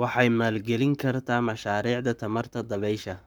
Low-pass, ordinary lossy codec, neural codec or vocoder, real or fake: none; none; codec, 44.1 kHz, 7.8 kbps, Pupu-Codec; fake